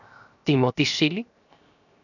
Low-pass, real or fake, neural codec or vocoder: 7.2 kHz; fake; codec, 16 kHz, 0.7 kbps, FocalCodec